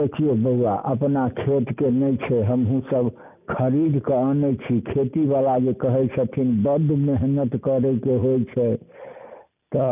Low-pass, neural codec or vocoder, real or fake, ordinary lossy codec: 3.6 kHz; none; real; none